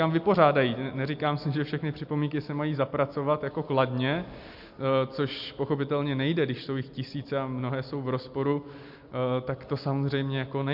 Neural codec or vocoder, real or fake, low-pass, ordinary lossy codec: none; real; 5.4 kHz; MP3, 48 kbps